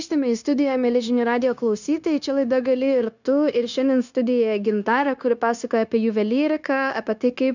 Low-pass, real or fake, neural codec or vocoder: 7.2 kHz; fake; codec, 16 kHz, 0.9 kbps, LongCat-Audio-Codec